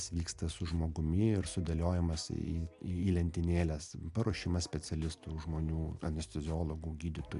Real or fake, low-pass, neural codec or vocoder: real; 10.8 kHz; none